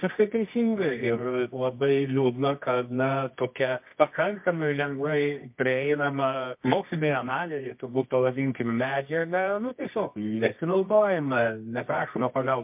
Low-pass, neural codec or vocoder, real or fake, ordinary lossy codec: 3.6 kHz; codec, 24 kHz, 0.9 kbps, WavTokenizer, medium music audio release; fake; MP3, 32 kbps